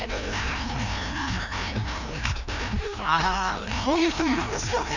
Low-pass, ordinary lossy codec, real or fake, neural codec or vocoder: 7.2 kHz; none; fake; codec, 16 kHz, 1 kbps, FreqCodec, larger model